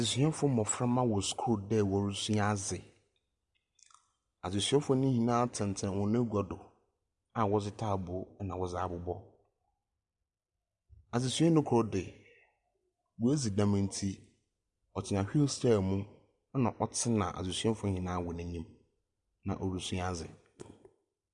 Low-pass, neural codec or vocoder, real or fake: 10.8 kHz; none; real